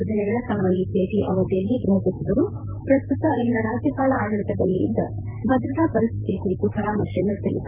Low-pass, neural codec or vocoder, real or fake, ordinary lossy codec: 3.6 kHz; vocoder, 44.1 kHz, 128 mel bands, Pupu-Vocoder; fake; none